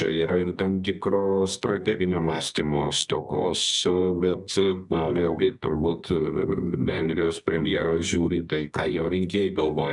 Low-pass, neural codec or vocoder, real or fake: 10.8 kHz; codec, 24 kHz, 0.9 kbps, WavTokenizer, medium music audio release; fake